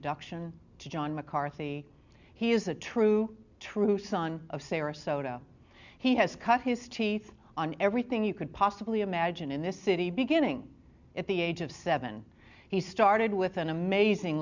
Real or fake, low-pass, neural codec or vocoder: real; 7.2 kHz; none